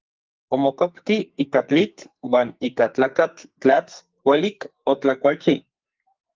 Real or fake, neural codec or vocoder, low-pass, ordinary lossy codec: fake; codec, 44.1 kHz, 2.6 kbps, SNAC; 7.2 kHz; Opus, 32 kbps